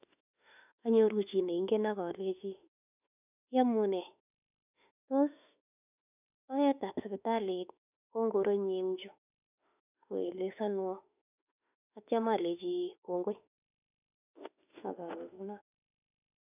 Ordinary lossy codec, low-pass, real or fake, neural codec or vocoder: none; 3.6 kHz; fake; autoencoder, 48 kHz, 32 numbers a frame, DAC-VAE, trained on Japanese speech